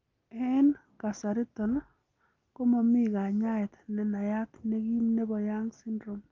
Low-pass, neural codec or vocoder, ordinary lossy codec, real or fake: 7.2 kHz; none; Opus, 24 kbps; real